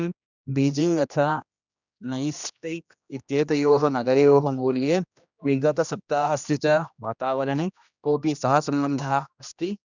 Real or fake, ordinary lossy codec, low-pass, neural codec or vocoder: fake; none; 7.2 kHz; codec, 16 kHz, 1 kbps, X-Codec, HuBERT features, trained on general audio